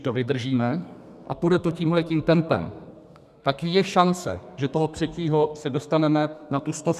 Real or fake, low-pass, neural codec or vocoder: fake; 14.4 kHz; codec, 32 kHz, 1.9 kbps, SNAC